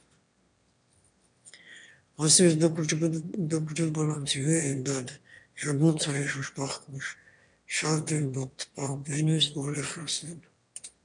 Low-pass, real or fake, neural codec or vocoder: 9.9 kHz; fake; autoencoder, 22.05 kHz, a latent of 192 numbers a frame, VITS, trained on one speaker